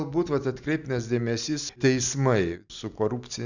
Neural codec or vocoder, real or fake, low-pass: none; real; 7.2 kHz